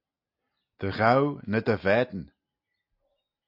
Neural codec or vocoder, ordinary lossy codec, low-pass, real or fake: none; AAC, 48 kbps; 5.4 kHz; real